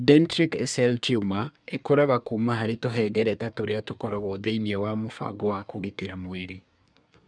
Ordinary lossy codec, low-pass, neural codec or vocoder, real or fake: none; 9.9 kHz; codec, 24 kHz, 1 kbps, SNAC; fake